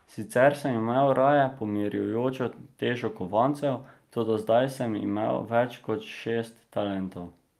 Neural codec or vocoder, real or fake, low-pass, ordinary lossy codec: none; real; 14.4 kHz; Opus, 32 kbps